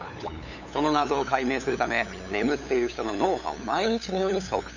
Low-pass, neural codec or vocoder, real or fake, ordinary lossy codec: 7.2 kHz; codec, 16 kHz, 8 kbps, FunCodec, trained on LibriTTS, 25 frames a second; fake; none